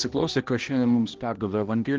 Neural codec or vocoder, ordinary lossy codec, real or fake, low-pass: codec, 16 kHz, 1 kbps, X-Codec, HuBERT features, trained on balanced general audio; Opus, 16 kbps; fake; 7.2 kHz